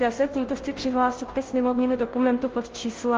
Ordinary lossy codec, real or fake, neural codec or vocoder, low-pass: Opus, 16 kbps; fake; codec, 16 kHz, 0.5 kbps, FunCodec, trained on Chinese and English, 25 frames a second; 7.2 kHz